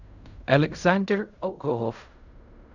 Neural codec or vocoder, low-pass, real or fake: codec, 16 kHz in and 24 kHz out, 0.4 kbps, LongCat-Audio-Codec, fine tuned four codebook decoder; 7.2 kHz; fake